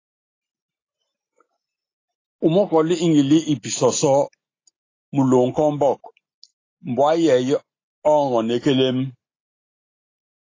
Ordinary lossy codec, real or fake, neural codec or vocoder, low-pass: AAC, 32 kbps; real; none; 7.2 kHz